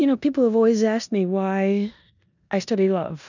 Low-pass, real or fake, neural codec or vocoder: 7.2 kHz; fake; codec, 16 kHz in and 24 kHz out, 0.9 kbps, LongCat-Audio-Codec, four codebook decoder